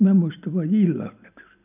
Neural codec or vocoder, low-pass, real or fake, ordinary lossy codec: none; 3.6 kHz; real; none